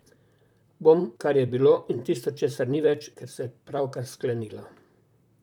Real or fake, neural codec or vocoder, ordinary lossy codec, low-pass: fake; vocoder, 44.1 kHz, 128 mel bands, Pupu-Vocoder; none; 19.8 kHz